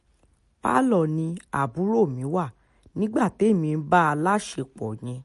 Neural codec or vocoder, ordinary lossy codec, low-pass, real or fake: none; MP3, 48 kbps; 14.4 kHz; real